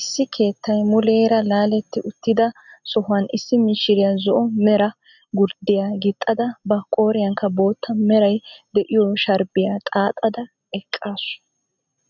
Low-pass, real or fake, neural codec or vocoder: 7.2 kHz; real; none